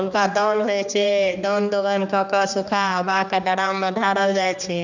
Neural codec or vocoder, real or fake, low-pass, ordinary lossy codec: codec, 16 kHz, 2 kbps, X-Codec, HuBERT features, trained on general audio; fake; 7.2 kHz; none